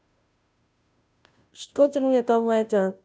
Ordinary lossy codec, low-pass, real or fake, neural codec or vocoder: none; none; fake; codec, 16 kHz, 0.5 kbps, FunCodec, trained on Chinese and English, 25 frames a second